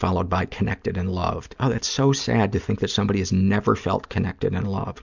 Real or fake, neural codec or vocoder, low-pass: real; none; 7.2 kHz